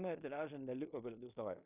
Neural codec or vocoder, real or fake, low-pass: codec, 16 kHz in and 24 kHz out, 0.9 kbps, LongCat-Audio-Codec, fine tuned four codebook decoder; fake; 3.6 kHz